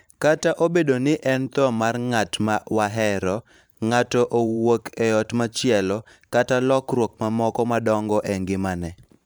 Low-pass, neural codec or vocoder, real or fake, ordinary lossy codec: none; vocoder, 44.1 kHz, 128 mel bands every 512 samples, BigVGAN v2; fake; none